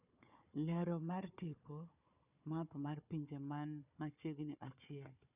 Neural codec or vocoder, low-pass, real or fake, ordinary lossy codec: codec, 16 kHz, 4 kbps, FunCodec, trained on Chinese and English, 50 frames a second; 3.6 kHz; fake; none